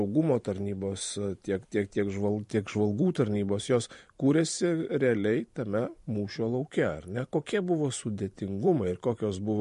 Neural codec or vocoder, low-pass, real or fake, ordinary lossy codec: vocoder, 44.1 kHz, 128 mel bands every 512 samples, BigVGAN v2; 14.4 kHz; fake; MP3, 48 kbps